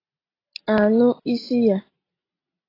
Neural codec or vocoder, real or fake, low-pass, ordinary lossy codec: none; real; 5.4 kHz; AAC, 24 kbps